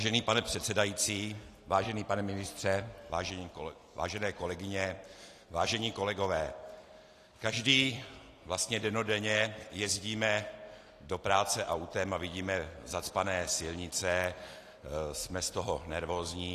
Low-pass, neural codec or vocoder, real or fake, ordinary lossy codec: 14.4 kHz; none; real; AAC, 48 kbps